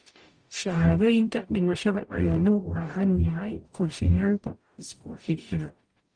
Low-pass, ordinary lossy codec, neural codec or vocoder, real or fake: 9.9 kHz; Opus, 32 kbps; codec, 44.1 kHz, 0.9 kbps, DAC; fake